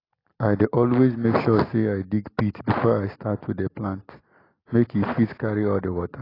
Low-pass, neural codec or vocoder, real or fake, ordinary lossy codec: 5.4 kHz; none; real; AAC, 24 kbps